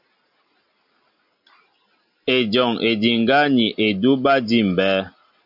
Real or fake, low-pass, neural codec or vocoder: real; 5.4 kHz; none